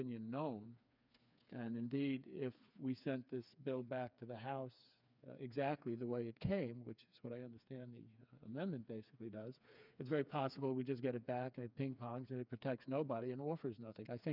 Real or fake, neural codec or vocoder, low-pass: fake; codec, 16 kHz, 4 kbps, FreqCodec, smaller model; 5.4 kHz